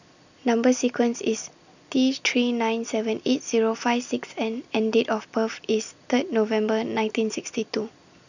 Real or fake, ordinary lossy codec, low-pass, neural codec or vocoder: real; none; 7.2 kHz; none